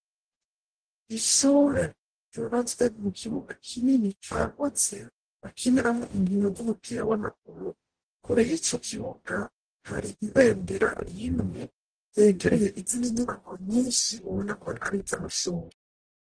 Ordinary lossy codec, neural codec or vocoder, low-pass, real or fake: Opus, 16 kbps; codec, 44.1 kHz, 0.9 kbps, DAC; 9.9 kHz; fake